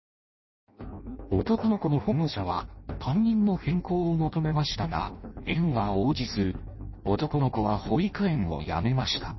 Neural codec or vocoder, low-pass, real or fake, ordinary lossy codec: codec, 16 kHz in and 24 kHz out, 0.6 kbps, FireRedTTS-2 codec; 7.2 kHz; fake; MP3, 24 kbps